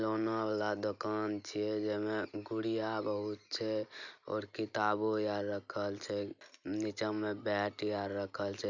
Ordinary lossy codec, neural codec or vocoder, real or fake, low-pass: none; none; real; 7.2 kHz